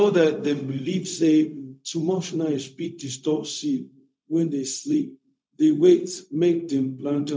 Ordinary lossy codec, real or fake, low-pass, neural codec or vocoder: none; fake; none; codec, 16 kHz, 0.4 kbps, LongCat-Audio-Codec